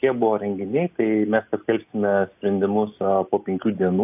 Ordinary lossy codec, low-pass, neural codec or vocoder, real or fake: AAC, 32 kbps; 3.6 kHz; none; real